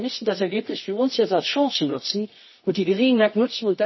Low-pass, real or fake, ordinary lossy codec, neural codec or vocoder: 7.2 kHz; fake; MP3, 24 kbps; codec, 24 kHz, 0.9 kbps, WavTokenizer, medium music audio release